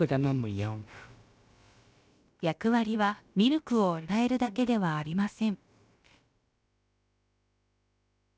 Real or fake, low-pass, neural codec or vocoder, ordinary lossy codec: fake; none; codec, 16 kHz, about 1 kbps, DyCAST, with the encoder's durations; none